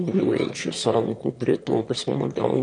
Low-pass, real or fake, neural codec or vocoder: 9.9 kHz; fake; autoencoder, 22.05 kHz, a latent of 192 numbers a frame, VITS, trained on one speaker